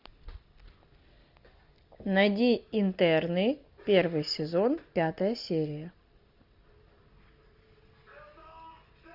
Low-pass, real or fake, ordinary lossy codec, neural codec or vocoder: 5.4 kHz; real; Opus, 64 kbps; none